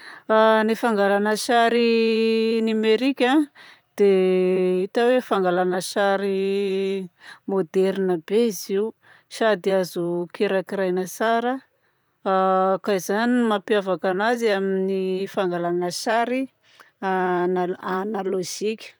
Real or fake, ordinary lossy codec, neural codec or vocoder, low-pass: fake; none; vocoder, 44.1 kHz, 128 mel bands, Pupu-Vocoder; none